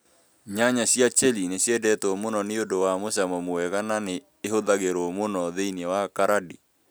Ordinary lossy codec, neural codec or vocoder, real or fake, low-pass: none; none; real; none